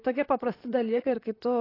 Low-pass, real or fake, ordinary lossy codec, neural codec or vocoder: 5.4 kHz; fake; AAC, 24 kbps; vocoder, 44.1 kHz, 128 mel bands, Pupu-Vocoder